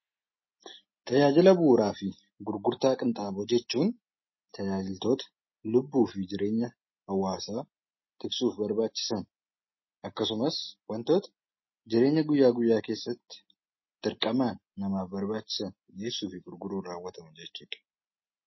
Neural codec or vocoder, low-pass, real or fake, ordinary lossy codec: none; 7.2 kHz; real; MP3, 24 kbps